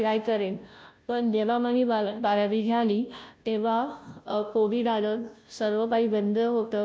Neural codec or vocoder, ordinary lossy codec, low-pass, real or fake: codec, 16 kHz, 0.5 kbps, FunCodec, trained on Chinese and English, 25 frames a second; none; none; fake